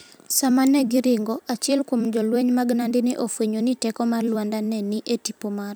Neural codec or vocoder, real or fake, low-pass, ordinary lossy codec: vocoder, 44.1 kHz, 128 mel bands every 256 samples, BigVGAN v2; fake; none; none